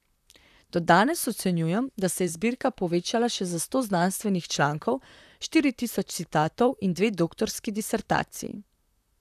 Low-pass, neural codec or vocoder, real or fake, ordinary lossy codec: 14.4 kHz; vocoder, 44.1 kHz, 128 mel bands, Pupu-Vocoder; fake; none